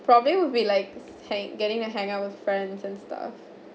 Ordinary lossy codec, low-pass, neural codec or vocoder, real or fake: none; none; none; real